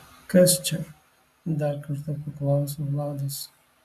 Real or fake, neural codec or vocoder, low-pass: real; none; 14.4 kHz